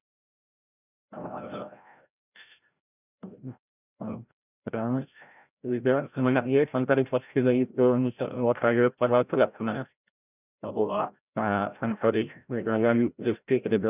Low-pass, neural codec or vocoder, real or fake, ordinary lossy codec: 3.6 kHz; codec, 16 kHz, 0.5 kbps, FreqCodec, larger model; fake; none